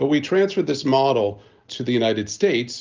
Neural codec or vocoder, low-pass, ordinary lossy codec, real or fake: none; 7.2 kHz; Opus, 32 kbps; real